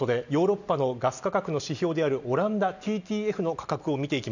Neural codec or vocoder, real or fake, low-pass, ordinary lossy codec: none; real; 7.2 kHz; none